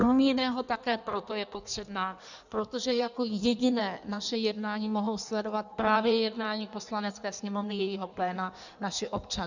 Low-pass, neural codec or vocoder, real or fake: 7.2 kHz; codec, 16 kHz in and 24 kHz out, 1.1 kbps, FireRedTTS-2 codec; fake